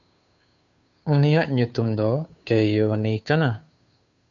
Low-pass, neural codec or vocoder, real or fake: 7.2 kHz; codec, 16 kHz, 2 kbps, FunCodec, trained on Chinese and English, 25 frames a second; fake